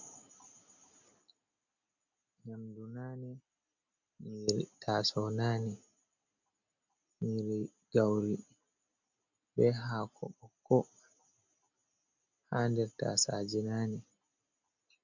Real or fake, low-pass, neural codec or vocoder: real; 7.2 kHz; none